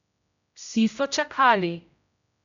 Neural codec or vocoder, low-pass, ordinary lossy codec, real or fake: codec, 16 kHz, 0.5 kbps, X-Codec, HuBERT features, trained on general audio; 7.2 kHz; none; fake